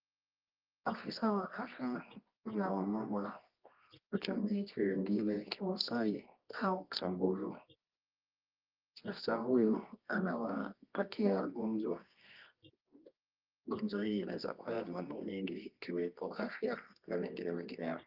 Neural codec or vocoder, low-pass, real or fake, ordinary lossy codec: codec, 24 kHz, 0.9 kbps, WavTokenizer, medium music audio release; 5.4 kHz; fake; Opus, 32 kbps